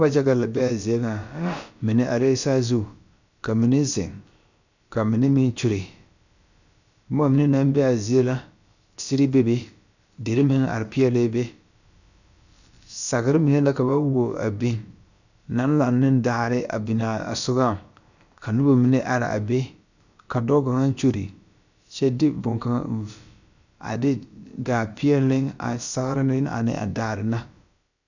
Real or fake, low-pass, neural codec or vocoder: fake; 7.2 kHz; codec, 16 kHz, about 1 kbps, DyCAST, with the encoder's durations